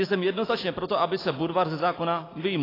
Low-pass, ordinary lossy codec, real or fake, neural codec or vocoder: 5.4 kHz; AAC, 24 kbps; real; none